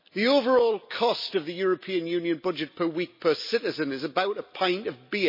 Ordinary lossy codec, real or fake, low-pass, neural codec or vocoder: MP3, 24 kbps; real; 5.4 kHz; none